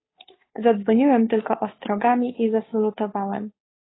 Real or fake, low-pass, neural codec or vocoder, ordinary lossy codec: fake; 7.2 kHz; codec, 16 kHz, 2 kbps, FunCodec, trained on Chinese and English, 25 frames a second; AAC, 16 kbps